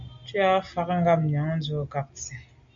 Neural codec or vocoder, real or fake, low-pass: none; real; 7.2 kHz